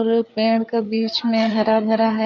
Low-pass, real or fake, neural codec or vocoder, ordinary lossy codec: 7.2 kHz; fake; codec, 16 kHz, 4 kbps, FreqCodec, larger model; none